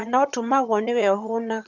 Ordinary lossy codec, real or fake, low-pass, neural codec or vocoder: none; fake; 7.2 kHz; vocoder, 22.05 kHz, 80 mel bands, HiFi-GAN